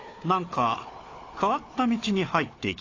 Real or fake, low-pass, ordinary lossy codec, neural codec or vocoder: fake; 7.2 kHz; AAC, 32 kbps; codec, 16 kHz, 4 kbps, FunCodec, trained on Chinese and English, 50 frames a second